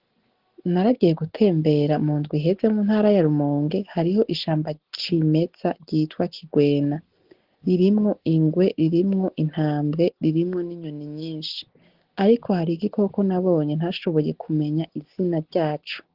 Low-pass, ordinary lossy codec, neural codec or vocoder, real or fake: 5.4 kHz; Opus, 16 kbps; none; real